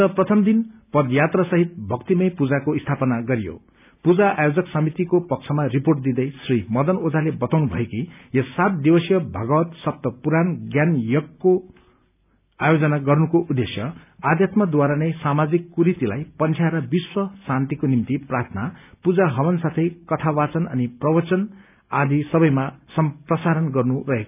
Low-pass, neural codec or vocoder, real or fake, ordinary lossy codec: 3.6 kHz; none; real; none